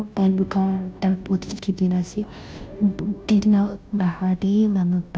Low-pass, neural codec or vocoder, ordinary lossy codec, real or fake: none; codec, 16 kHz, 0.5 kbps, FunCodec, trained on Chinese and English, 25 frames a second; none; fake